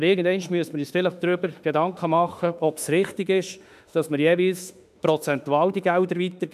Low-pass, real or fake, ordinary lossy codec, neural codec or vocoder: 14.4 kHz; fake; none; autoencoder, 48 kHz, 32 numbers a frame, DAC-VAE, trained on Japanese speech